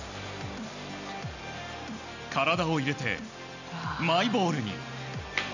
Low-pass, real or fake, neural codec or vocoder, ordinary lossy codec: 7.2 kHz; real; none; none